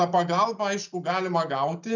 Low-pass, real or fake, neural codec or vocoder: 7.2 kHz; real; none